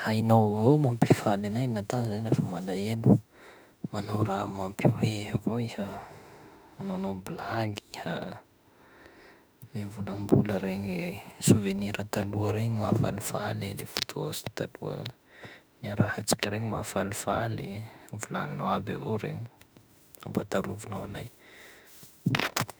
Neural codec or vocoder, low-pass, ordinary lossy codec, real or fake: autoencoder, 48 kHz, 32 numbers a frame, DAC-VAE, trained on Japanese speech; none; none; fake